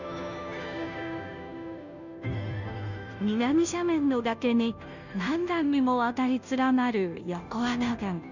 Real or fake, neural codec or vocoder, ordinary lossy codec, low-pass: fake; codec, 16 kHz, 0.5 kbps, FunCodec, trained on Chinese and English, 25 frames a second; none; 7.2 kHz